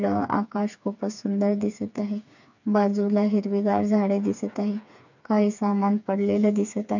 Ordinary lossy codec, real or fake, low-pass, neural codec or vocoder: none; fake; 7.2 kHz; codec, 16 kHz, 4 kbps, FreqCodec, smaller model